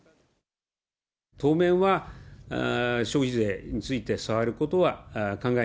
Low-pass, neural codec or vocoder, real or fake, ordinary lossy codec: none; none; real; none